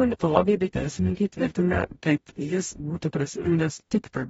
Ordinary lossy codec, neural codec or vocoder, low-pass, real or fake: AAC, 24 kbps; codec, 44.1 kHz, 0.9 kbps, DAC; 19.8 kHz; fake